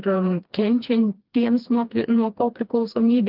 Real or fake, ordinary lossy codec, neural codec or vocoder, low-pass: fake; Opus, 32 kbps; codec, 16 kHz, 2 kbps, FreqCodec, smaller model; 5.4 kHz